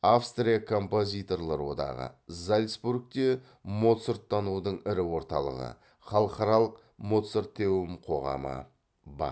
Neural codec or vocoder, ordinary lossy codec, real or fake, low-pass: none; none; real; none